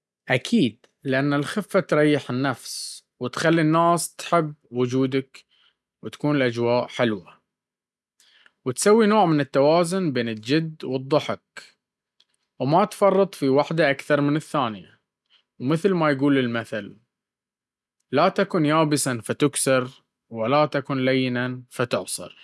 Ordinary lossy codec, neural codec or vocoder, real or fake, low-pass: none; none; real; none